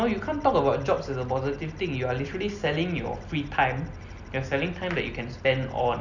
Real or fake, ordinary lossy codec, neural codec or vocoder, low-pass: real; none; none; 7.2 kHz